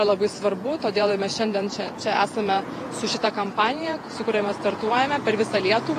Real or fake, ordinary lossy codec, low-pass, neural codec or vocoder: fake; AAC, 48 kbps; 14.4 kHz; vocoder, 48 kHz, 128 mel bands, Vocos